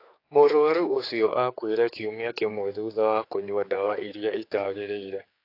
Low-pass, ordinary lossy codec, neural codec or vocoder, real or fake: 5.4 kHz; AAC, 32 kbps; codec, 16 kHz, 4 kbps, X-Codec, HuBERT features, trained on general audio; fake